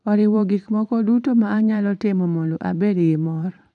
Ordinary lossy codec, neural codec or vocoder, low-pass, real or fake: none; vocoder, 44.1 kHz, 128 mel bands every 512 samples, BigVGAN v2; 10.8 kHz; fake